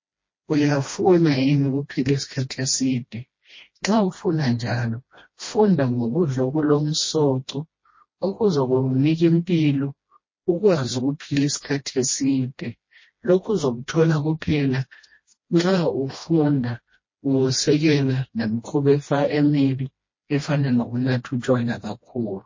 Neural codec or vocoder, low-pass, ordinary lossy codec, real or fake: codec, 16 kHz, 1 kbps, FreqCodec, smaller model; 7.2 kHz; MP3, 32 kbps; fake